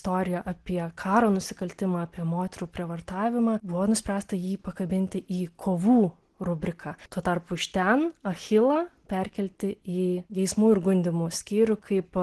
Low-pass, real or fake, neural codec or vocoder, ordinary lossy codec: 10.8 kHz; real; none; Opus, 16 kbps